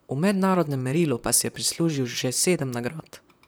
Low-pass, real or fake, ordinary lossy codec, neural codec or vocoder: none; fake; none; vocoder, 44.1 kHz, 128 mel bands, Pupu-Vocoder